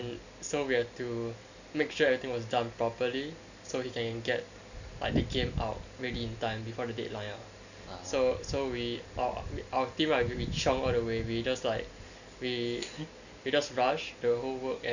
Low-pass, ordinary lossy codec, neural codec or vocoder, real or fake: 7.2 kHz; none; none; real